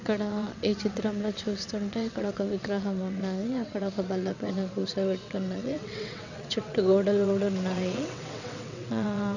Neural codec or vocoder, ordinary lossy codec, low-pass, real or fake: vocoder, 22.05 kHz, 80 mel bands, WaveNeXt; none; 7.2 kHz; fake